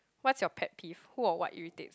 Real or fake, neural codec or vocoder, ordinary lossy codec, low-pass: real; none; none; none